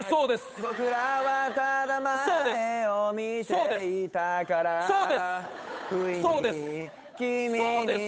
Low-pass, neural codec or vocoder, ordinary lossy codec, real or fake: none; codec, 16 kHz, 8 kbps, FunCodec, trained on Chinese and English, 25 frames a second; none; fake